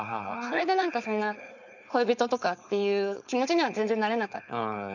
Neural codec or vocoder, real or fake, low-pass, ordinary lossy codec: codec, 16 kHz, 4.8 kbps, FACodec; fake; 7.2 kHz; none